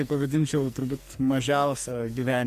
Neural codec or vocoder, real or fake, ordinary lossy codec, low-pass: codec, 44.1 kHz, 2.6 kbps, SNAC; fake; Opus, 64 kbps; 14.4 kHz